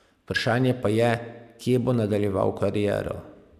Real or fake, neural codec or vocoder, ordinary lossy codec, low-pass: real; none; none; 14.4 kHz